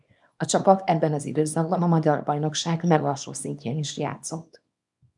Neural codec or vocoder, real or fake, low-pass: codec, 24 kHz, 0.9 kbps, WavTokenizer, small release; fake; 10.8 kHz